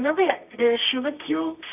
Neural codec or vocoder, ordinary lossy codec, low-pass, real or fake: codec, 24 kHz, 0.9 kbps, WavTokenizer, medium music audio release; none; 3.6 kHz; fake